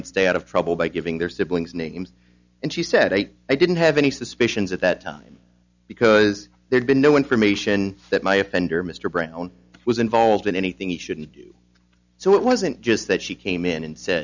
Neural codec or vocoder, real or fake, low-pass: none; real; 7.2 kHz